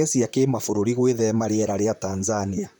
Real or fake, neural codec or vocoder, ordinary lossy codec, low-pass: fake; vocoder, 44.1 kHz, 128 mel bands, Pupu-Vocoder; none; none